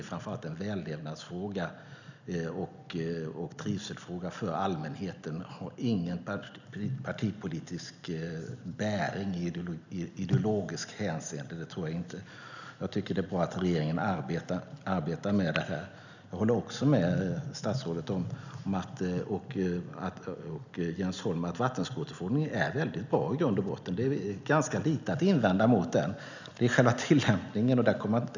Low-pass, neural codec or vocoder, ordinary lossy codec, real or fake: 7.2 kHz; none; none; real